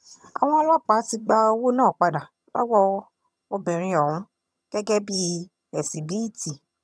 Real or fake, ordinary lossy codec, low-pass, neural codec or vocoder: fake; none; none; vocoder, 22.05 kHz, 80 mel bands, HiFi-GAN